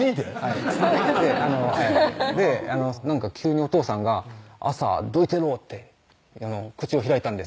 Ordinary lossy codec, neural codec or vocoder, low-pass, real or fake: none; none; none; real